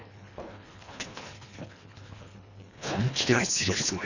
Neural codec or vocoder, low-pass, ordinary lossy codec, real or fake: codec, 24 kHz, 1.5 kbps, HILCodec; 7.2 kHz; none; fake